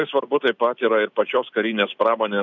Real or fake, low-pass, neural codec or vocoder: real; 7.2 kHz; none